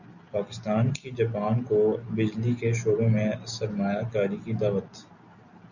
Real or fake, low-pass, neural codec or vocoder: real; 7.2 kHz; none